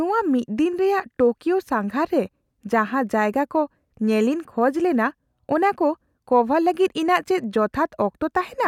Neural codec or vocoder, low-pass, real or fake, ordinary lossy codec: none; 19.8 kHz; real; none